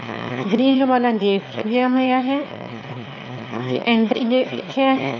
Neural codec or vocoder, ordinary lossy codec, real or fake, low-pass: autoencoder, 22.05 kHz, a latent of 192 numbers a frame, VITS, trained on one speaker; none; fake; 7.2 kHz